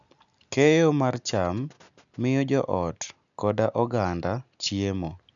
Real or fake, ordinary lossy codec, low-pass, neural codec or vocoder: real; none; 7.2 kHz; none